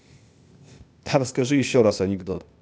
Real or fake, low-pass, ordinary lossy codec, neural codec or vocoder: fake; none; none; codec, 16 kHz, 0.8 kbps, ZipCodec